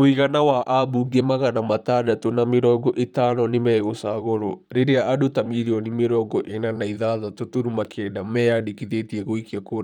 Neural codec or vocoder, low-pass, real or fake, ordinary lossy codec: vocoder, 44.1 kHz, 128 mel bands, Pupu-Vocoder; 19.8 kHz; fake; none